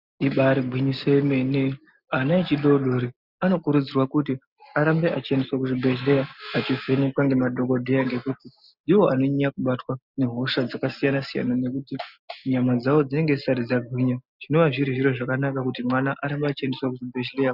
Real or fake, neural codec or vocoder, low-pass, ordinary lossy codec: real; none; 5.4 kHz; Opus, 64 kbps